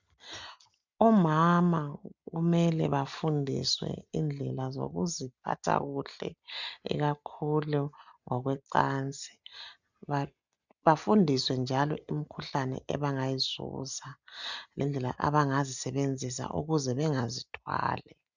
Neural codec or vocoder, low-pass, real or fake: none; 7.2 kHz; real